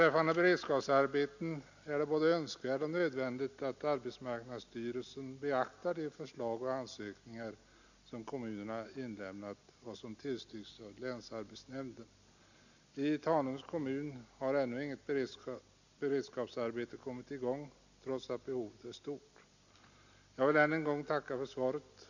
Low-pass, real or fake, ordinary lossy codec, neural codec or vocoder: 7.2 kHz; real; none; none